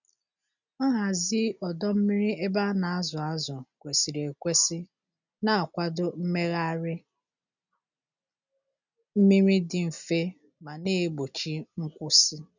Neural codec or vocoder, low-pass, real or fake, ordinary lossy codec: none; 7.2 kHz; real; none